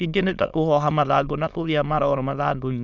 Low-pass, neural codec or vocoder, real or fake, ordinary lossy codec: 7.2 kHz; autoencoder, 22.05 kHz, a latent of 192 numbers a frame, VITS, trained on many speakers; fake; none